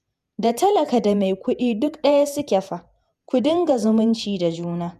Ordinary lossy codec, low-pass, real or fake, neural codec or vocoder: MP3, 96 kbps; 14.4 kHz; fake; vocoder, 48 kHz, 128 mel bands, Vocos